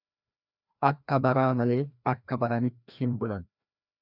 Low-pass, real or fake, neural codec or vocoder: 5.4 kHz; fake; codec, 16 kHz, 1 kbps, FreqCodec, larger model